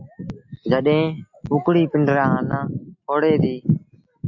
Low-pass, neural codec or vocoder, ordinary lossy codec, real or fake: 7.2 kHz; none; AAC, 48 kbps; real